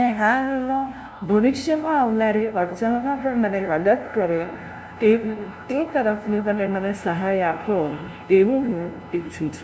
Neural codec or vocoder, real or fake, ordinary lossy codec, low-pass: codec, 16 kHz, 0.5 kbps, FunCodec, trained on LibriTTS, 25 frames a second; fake; none; none